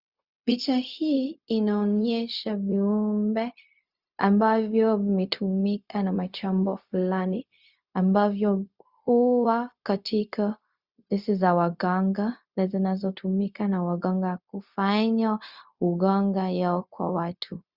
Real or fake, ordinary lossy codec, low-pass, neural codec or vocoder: fake; Opus, 64 kbps; 5.4 kHz; codec, 16 kHz, 0.4 kbps, LongCat-Audio-Codec